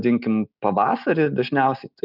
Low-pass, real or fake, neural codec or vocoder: 5.4 kHz; real; none